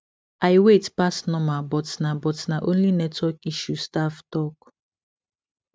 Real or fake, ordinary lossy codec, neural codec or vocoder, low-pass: real; none; none; none